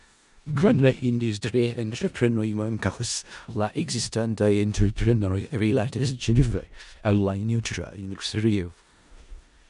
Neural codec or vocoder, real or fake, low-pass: codec, 16 kHz in and 24 kHz out, 0.4 kbps, LongCat-Audio-Codec, four codebook decoder; fake; 10.8 kHz